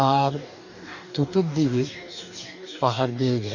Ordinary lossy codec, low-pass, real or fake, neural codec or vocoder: none; 7.2 kHz; fake; codec, 44.1 kHz, 2.6 kbps, DAC